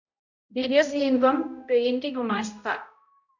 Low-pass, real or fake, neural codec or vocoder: 7.2 kHz; fake; codec, 16 kHz, 0.5 kbps, X-Codec, HuBERT features, trained on balanced general audio